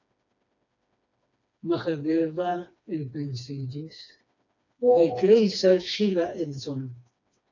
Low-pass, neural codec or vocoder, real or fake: 7.2 kHz; codec, 16 kHz, 2 kbps, FreqCodec, smaller model; fake